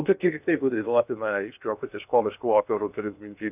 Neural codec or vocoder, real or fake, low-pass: codec, 16 kHz in and 24 kHz out, 0.6 kbps, FocalCodec, streaming, 2048 codes; fake; 3.6 kHz